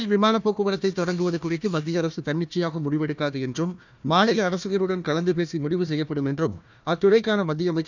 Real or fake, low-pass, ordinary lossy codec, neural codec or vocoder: fake; 7.2 kHz; none; codec, 16 kHz, 1 kbps, FunCodec, trained on Chinese and English, 50 frames a second